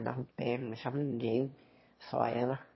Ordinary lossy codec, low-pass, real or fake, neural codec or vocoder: MP3, 24 kbps; 7.2 kHz; fake; autoencoder, 22.05 kHz, a latent of 192 numbers a frame, VITS, trained on one speaker